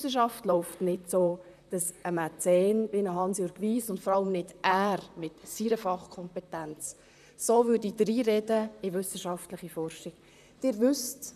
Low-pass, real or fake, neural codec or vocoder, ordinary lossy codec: 14.4 kHz; fake; vocoder, 44.1 kHz, 128 mel bands, Pupu-Vocoder; none